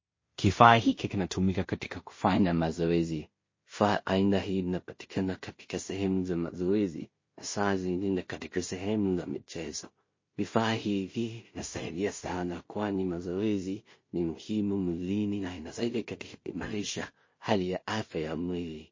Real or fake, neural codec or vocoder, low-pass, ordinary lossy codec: fake; codec, 16 kHz in and 24 kHz out, 0.4 kbps, LongCat-Audio-Codec, two codebook decoder; 7.2 kHz; MP3, 32 kbps